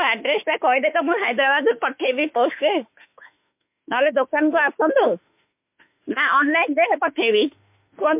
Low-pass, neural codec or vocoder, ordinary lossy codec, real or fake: 3.6 kHz; autoencoder, 48 kHz, 32 numbers a frame, DAC-VAE, trained on Japanese speech; MP3, 32 kbps; fake